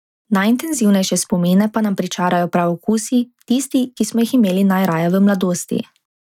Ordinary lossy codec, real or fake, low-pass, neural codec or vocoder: none; real; 19.8 kHz; none